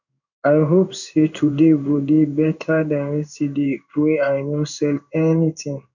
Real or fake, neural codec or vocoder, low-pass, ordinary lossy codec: fake; codec, 16 kHz in and 24 kHz out, 1 kbps, XY-Tokenizer; 7.2 kHz; none